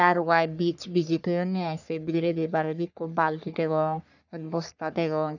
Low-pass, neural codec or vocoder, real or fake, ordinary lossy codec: 7.2 kHz; codec, 44.1 kHz, 3.4 kbps, Pupu-Codec; fake; none